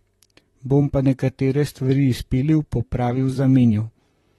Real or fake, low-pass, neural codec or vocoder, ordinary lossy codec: fake; 19.8 kHz; vocoder, 44.1 kHz, 128 mel bands, Pupu-Vocoder; AAC, 32 kbps